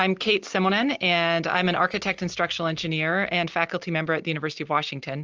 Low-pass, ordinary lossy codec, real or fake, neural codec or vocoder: 7.2 kHz; Opus, 24 kbps; real; none